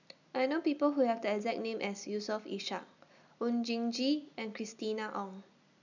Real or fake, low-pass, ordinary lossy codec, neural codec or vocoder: real; 7.2 kHz; none; none